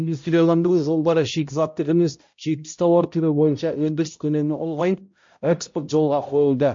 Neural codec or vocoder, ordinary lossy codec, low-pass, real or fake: codec, 16 kHz, 0.5 kbps, X-Codec, HuBERT features, trained on balanced general audio; MP3, 48 kbps; 7.2 kHz; fake